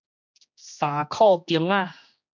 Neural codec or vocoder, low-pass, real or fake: codec, 16 kHz, 2 kbps, X-Codec, HuBERT features, trained on general audio; 7.2 kHz; fake